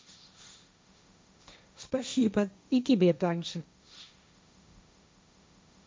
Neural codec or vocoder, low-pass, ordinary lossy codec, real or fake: codec, 16 kHz, 1.1 kbps, Voila-Tokenizer; none; none; fake